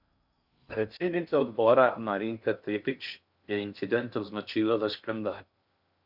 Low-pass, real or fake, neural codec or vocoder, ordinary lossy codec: 5.4 kHz; fake; codec, 16 kHz in and 24 kHz out, 0.6 kbps, FocalCodec, streaming, 2048 codes; Opus, 64 kbps